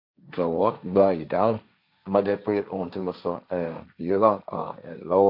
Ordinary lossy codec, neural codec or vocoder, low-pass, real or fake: MP3, 48 kbps; codec, 16 kHz, 1.1 kbps, Voila-Tokenizer; 5.4 kHz; fake